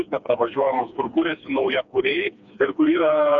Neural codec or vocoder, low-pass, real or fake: codec, 16 kHz, 2 kbps, FreqCodec, smaller model; 7.2 kHz; fake